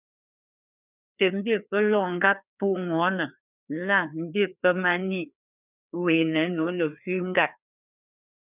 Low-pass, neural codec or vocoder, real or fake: 3.6 kHz; codec, 16 kHz, 2 kbps, FreqCodec, larger model; fake